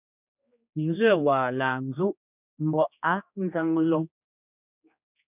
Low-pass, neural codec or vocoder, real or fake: 3.6 kHz; codec, 16 kHz, 2 kbps, X-Codec, HuBERT features, trained on general audio; fake